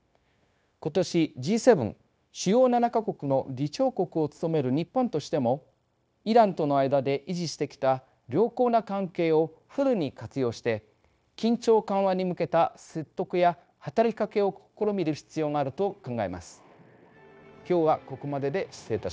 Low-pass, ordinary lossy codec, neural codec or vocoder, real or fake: none; none; codec, 16 kHz, 0.9 kbps, LongCat-Audio-Codec; fake